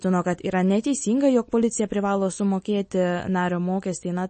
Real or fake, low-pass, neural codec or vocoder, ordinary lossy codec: real; 10.8 kHz; none; MP3, 32 kbps